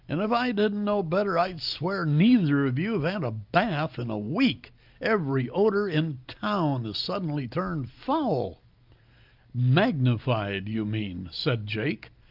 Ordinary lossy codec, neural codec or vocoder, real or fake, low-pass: Opus, 24 kbps; none; real; 5.4 kHz